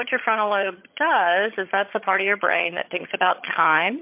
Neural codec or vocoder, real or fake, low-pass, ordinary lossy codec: vocoder, 22.05 kHz, 80 mel bands, HiFi-GAN; fake; 3.6 kHz; MP3, 32 kbps